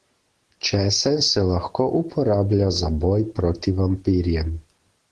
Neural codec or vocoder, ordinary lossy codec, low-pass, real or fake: none; Opus, 16 kbps; 10.8 kHz; real